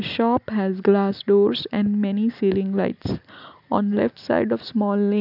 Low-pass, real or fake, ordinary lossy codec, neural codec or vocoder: 5.4 kHz; real; none; none